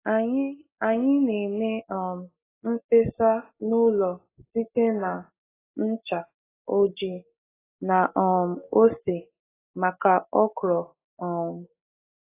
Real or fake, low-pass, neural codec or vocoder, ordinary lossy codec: real; 3.6 kHz; none; AAC, 16 kbps